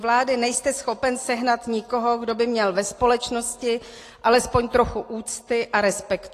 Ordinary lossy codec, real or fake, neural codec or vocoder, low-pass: AAC, 48 kbps; real; none; 14.4 kHz